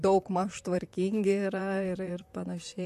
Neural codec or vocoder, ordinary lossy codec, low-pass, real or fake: vocoder, 44.1 kHz, 128 mel bands, Pupu-Vocoder; MP3, 64 kbps; 14.4 kHz; fake